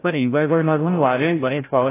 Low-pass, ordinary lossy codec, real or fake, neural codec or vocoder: 3.6 kHz; AAC, 16 kbps; fake; codec, 16 kHz, 0.5 kbps, FreqCodec, larger model